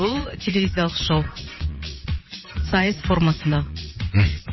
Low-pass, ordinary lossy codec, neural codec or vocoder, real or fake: 7.2 kHz; MP3, 24 kbps; vocoder, 22.05 kHz, 80 mel bands, WaveNeXt; fake